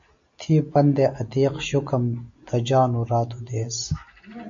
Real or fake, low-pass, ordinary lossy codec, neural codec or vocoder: real; 7.2 kHz; AAC, 64 kbps; none